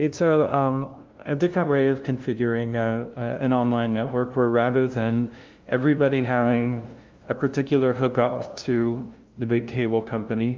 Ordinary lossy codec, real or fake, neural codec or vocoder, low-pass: Opus, 32 kbps; fake; codec, 16 kHz, 0.5 kbps, FunCodec, trained on LibriTTS, 25 frames a second; 7.2 kHz